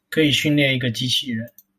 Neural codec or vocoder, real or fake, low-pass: none; real; 14.4 kHz